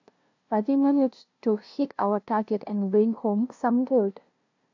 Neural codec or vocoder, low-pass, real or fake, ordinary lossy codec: codec, 16 kHz, 0.5 kbps, FunCodec, trained on LibriTTS, 25 frames a second; 7.2 kHz; fake; AAC, 48 kbps